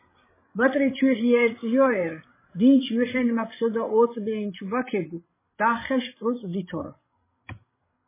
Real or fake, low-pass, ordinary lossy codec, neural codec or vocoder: fake; 3.6 kHz; MP3, 16 kbps; codec, 16 kHz, 16 kbps, FreqCodec, larger model